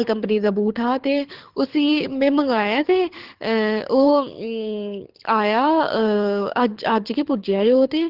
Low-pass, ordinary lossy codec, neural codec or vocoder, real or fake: 5.4 kHz; Opus, 16 kbps; codec, 24 kHz, 6 kbps, HILCodec; fake